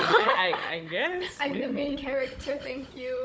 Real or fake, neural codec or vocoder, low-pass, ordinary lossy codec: fake; codec, 16 kHz, 16 kbps, FunCodec, trained on Chinese and English, 50 frames a second; none; none